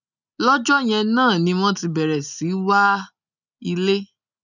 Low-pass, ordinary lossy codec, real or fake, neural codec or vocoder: 7.2 kHz; none; real; none